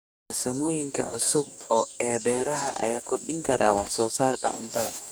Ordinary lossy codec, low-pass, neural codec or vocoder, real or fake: none; none; codec, 44.1 kHz, 2.6 kbps, DAC; fake